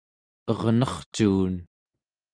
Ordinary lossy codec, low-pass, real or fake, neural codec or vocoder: Opus, 32 kbps; 9.9 kHz; real; none